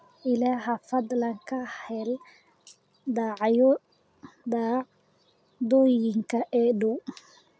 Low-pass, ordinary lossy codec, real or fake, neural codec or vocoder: none; none; real; none